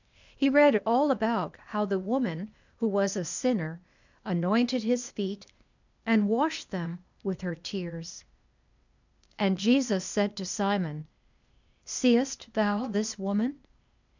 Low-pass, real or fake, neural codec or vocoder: 7.2 kHz; fake; codec, 16 kHz, 0.8 kbps, ZipCodec